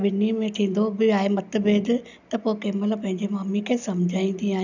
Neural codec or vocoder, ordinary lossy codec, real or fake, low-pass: none; none; real; 7.2 kHz